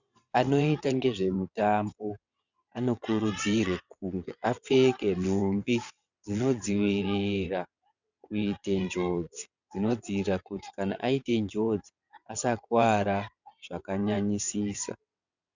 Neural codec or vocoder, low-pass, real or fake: vocoder, 22.05 kHz, 80 mel bands, WaveNeXt; 7.2 kHz; fake